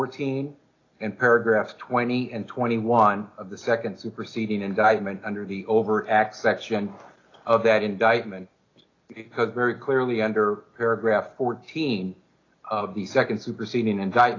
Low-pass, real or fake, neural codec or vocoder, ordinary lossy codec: 7.2 kHz; real; none; AAC, 32 kbps